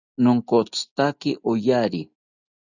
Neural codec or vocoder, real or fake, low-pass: none; real; 7.2 kHz